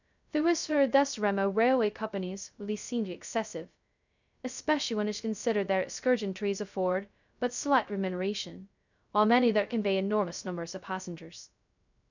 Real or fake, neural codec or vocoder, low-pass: fake; codec, 16 kHz, 0.2 kbps, FocalCodec; 7.2 kHz